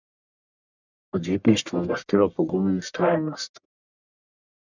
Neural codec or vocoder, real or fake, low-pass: codec, 44.1 kHz, 1.7 kbps, Pupu-Codec; fake; 7.2 kHz